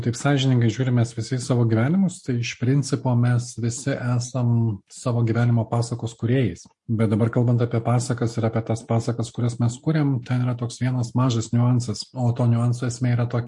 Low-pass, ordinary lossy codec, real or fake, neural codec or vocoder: 10.8 kHz; MP3, 48 kbps; real; none